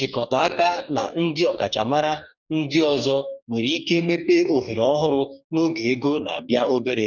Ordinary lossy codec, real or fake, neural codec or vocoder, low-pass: none; fake; codec, 44.1 kHz, 2.6 kbps, DAC; 7.2 kHz